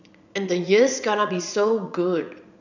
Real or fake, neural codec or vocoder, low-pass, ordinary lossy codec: fake; vocoder, 44.1 kHz, 80 mel bands, Vocos; 7.2 kHz; none